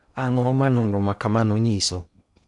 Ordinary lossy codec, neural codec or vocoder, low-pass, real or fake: none; codec, 16 kHz in and 24 kHz out, 0.6 kbps, FocalCodec, streaming, 2048 codes; 10.8 kHz; fake